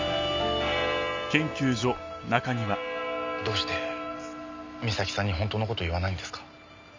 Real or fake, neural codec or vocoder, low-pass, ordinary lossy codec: real; none; 7.2 kHz; MP3, 64 kbps